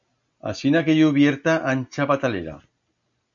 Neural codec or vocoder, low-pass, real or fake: none; 7.2 kHz; real